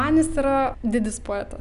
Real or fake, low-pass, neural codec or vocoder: real; 10.8 kHz; none